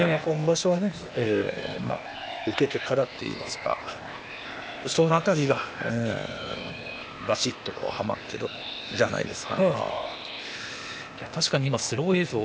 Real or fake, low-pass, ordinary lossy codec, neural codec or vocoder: fake; none; none; codec, 16 kHz, 0.8 kbps, ZipCodec